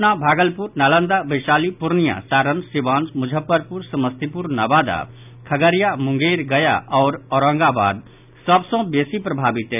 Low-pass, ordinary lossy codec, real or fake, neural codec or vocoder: 3.6 kHz; none; real; none